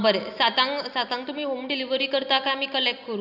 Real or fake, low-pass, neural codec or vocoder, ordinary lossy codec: real; 5.4 kHz; none; none